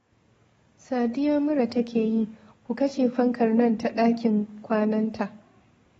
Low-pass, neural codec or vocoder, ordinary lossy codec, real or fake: 19.8 kHz; codec, 44.1 kHz, 7.8 kbps, DAC; AAC, 24 kbps; fake